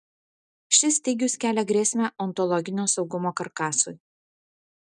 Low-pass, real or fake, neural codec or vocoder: 10.8 kHz; real; none